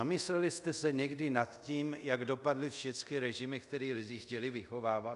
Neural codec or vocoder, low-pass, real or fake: codec, 24 kHz, 0.5 kbps, DualCodec; 10.8 kHz; fake